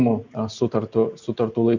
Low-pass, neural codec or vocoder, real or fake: 7.2 kHz; none; real